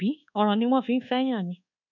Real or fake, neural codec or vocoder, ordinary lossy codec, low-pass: fake; codec, 24 kHz, 1.2 kbps, DualCodec; none; 7.2 kHz